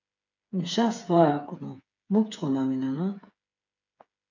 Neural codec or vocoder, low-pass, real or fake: codec, 16 kHz, 8 kbps, FreqCodec, smaller model; 7.2 kHz; fake